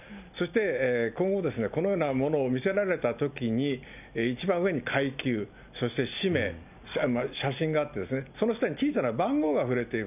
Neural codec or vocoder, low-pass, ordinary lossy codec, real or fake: none; 3.6 kHz; none; real